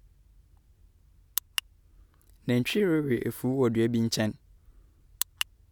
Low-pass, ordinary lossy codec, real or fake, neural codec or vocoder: 19.8 kHz; none; real; none